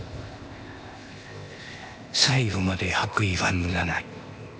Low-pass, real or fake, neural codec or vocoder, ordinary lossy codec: none; fake; codec, 16 kHz, 0.8 kbps, ZipCodec; none